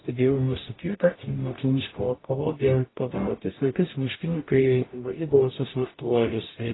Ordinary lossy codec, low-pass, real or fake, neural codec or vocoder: AAC, 16 kbps; 7.2 kHz; fake; codec, 44.1 kHz, 0.9 kbps, DAC